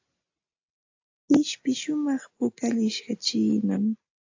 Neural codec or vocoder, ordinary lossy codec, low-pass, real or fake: none; AAC, 48 kbps; 7.2 kHz; real